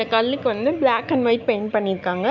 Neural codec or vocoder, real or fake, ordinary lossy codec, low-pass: none; real; none; 7.2 kHz